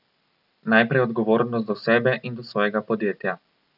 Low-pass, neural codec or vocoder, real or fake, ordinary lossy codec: 5.4 kHz; none; real; none